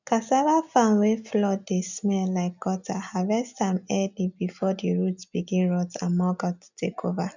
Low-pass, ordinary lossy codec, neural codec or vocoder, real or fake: 7.2 kHz; none; none; real